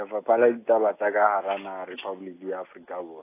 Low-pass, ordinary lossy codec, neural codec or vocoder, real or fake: 3.6 kHz; AAC, 24 kbps; none; real